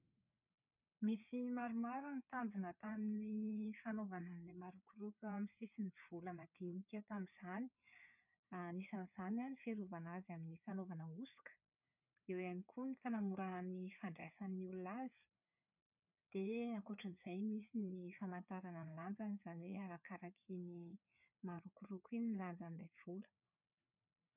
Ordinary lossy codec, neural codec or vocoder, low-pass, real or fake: none; codec, 16 kHz, 4 kbps, FreqCodec, larger model; 3.6 kHz; fake